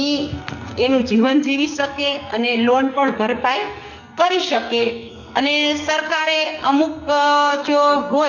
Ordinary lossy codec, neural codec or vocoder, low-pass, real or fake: none; codec, 44.1 kHz, 2.6 kbps, SNAC; 7.2 kHz; fake